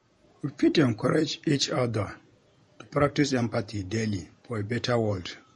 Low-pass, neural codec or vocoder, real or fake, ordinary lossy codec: 19.8 kHz; vocoder, 48 kHz, 128 mel bands, Vocos; fake; MP3, 48 kbps